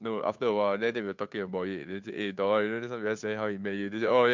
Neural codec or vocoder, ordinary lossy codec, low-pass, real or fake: codec, 16 kHz, 2 kbps, FunCodec, trained on Chinese and English, 25 frames a second; MP3, 64 kbps; 7.2 kHz; fake